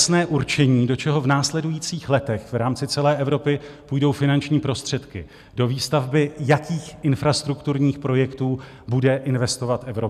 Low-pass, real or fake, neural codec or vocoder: 14.4 kHz; real; none